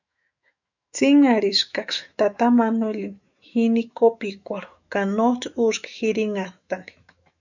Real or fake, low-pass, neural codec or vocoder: fake; 7.2 kHz; autoencoder, 48 kHz, 128 numbers a frame, DAC-VAE, trained on Japanese speech